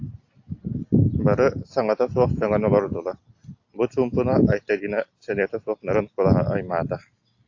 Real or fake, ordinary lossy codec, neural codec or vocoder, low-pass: real; AAC, 48 kbps; none; 7.2 kHz